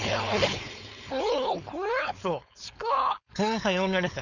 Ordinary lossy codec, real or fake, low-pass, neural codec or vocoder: none; fake; 7.2 kHz; codec, 16 kHz, 4.8 kbps, FACodec